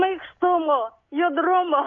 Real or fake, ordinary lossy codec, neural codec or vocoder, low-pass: real; AAC, 32 kbps; none; 7.2 kHz